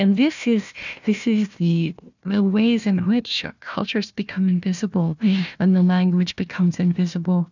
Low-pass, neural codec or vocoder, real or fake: 7.2 kHz; codec, 16 kHz, 1 kbps, FunCodec, trained on Chinese and English, 50 frames a second; fake